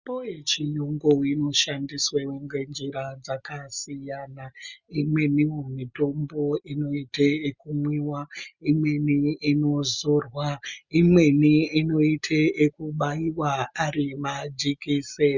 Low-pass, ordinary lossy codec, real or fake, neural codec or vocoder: 7.2 kHz; Opus, 64 kbps; real; none